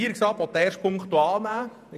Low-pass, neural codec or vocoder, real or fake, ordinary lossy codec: 14.4 kHz; vocoder, 48 kHz, 128 mel bands, Vocos; fake; none